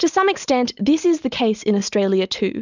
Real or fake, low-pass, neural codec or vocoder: real; 7.2 kHz; none